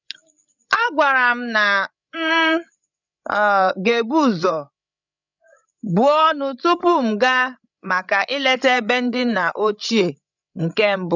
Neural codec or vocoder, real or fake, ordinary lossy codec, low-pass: codec, 16 kHz, 8 kbps, FreqCodec, larger model; fake; none; 7.2 kHz